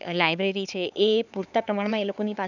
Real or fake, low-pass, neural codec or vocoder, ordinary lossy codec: fake; 7.2 kHz; codec, 16 kHz, 4 kbps, X-Codec, HuBERT features, trained on LibriSpeech; none